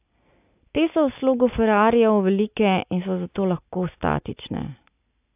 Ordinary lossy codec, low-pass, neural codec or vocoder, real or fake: AAC, 32 kbps; 3.6 kHz; none; real